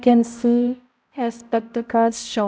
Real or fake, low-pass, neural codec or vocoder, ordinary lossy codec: fake; none; codec, 16 kHz, 0.5 kbps, X-Codec, HuBERT features, trained on balanced general audio; none